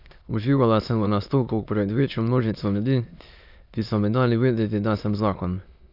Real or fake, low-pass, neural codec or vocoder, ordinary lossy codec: fake; 5.4 kHz; autoencoder, 22.05 kHz, a latent of 192 numbers a frame, VITS, trained on many speakers; none